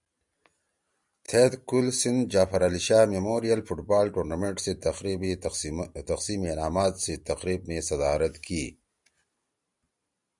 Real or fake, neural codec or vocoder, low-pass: real; none; 10.8 kHz